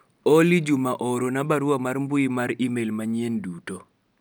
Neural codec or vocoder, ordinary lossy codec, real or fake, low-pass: none; none; real; none